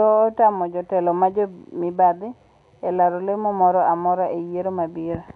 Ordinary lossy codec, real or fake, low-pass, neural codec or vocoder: none; real; 10.8 kHz; none